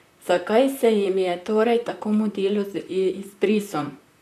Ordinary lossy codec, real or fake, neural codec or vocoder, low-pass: none; fake; vocoder, 44.1 kHz, 128 mel bands, Pupu-Vocoder; 14.4 kHz